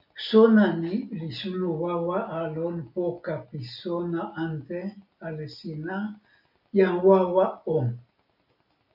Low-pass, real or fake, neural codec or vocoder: 5.4 kHz; real; none